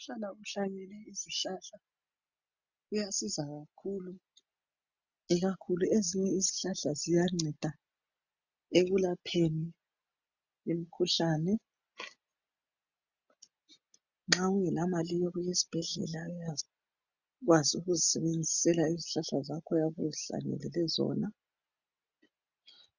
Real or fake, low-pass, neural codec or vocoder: real; 7.2 kHz; none